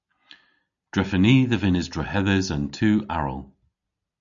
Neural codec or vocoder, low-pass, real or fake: none; 7.2 kHz; real